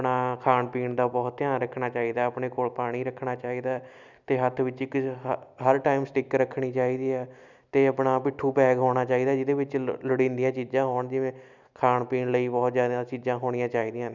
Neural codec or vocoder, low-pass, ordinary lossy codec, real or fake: none; 7.2 kHz; none; real